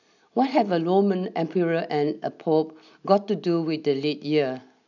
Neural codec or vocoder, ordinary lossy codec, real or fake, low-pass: none; none; real; 7.2 kHz